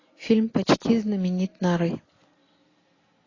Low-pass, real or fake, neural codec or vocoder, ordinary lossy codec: 7.2 kHz; real; none; AAC, 32 kbps